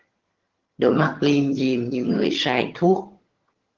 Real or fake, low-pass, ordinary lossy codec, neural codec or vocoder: fake; 7.2 kHz; Opus, 16 kbps; vocoder, 22.05 kHz, 80 mel bands, HiFi-GAN